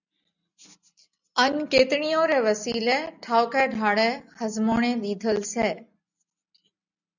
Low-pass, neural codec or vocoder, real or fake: 7.2 kHz; none; real